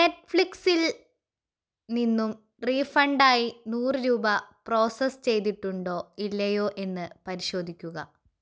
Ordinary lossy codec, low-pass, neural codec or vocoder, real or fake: none; none; none; real